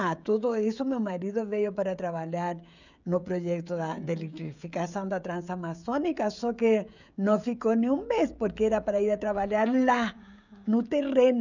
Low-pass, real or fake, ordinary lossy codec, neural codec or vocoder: 7.2 kHz; fake; none; codec, 16 kHz, 16 kbps, FreqCodec, smaller model